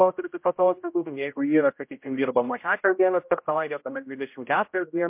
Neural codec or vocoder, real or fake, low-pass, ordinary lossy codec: codec, 16 kHz, 0.5 kbps, X-Codec, HuBERT features, trained on general audio; fake; 3.6 kHz; MP3, 32 kbps